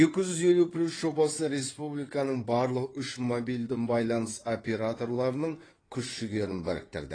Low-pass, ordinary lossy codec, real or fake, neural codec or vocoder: 9.9 kHz; AAC, 32 kbps; fake; codec, 16 kHz in and 24 kHz out, 2.2 kbps, FireRedTTS-2 codec